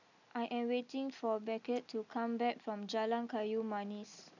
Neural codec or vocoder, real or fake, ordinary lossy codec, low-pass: none; real; none; 7.2 kHz